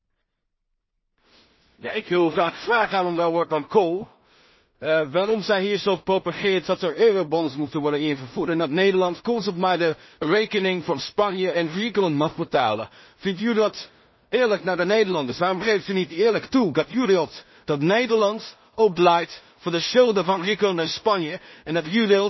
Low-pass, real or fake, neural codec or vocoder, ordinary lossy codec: 7.2 kHz; fake; codec, 16 kHz in and 24 kHz out, 0.4 kbps, LongCat-Audio-Codec, two codebook decoder; MP3, 24 kbps